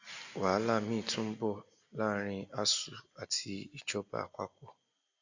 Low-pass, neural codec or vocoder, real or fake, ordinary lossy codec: 7.2 kHz; none; real; AAC, 48 kbps